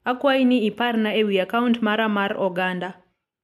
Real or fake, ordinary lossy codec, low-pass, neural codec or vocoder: real; MP3, 96 kbps; 14.4 kHz; none